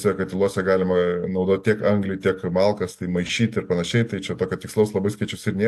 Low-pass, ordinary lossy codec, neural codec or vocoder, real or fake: 14.4 kHz; AAC, 64 kbps; none; real